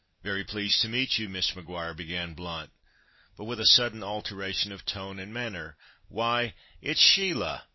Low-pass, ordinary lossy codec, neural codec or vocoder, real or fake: 7.2 kHz; MP3, 24 kbps; none; real